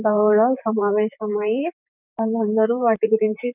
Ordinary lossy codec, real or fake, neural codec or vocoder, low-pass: none; fake; vocoder, 44.1 kHz, 128 mel bands, Pupu-Vocoder; 3.6 kHz